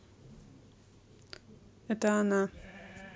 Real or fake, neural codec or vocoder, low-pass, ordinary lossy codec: real; none; none; none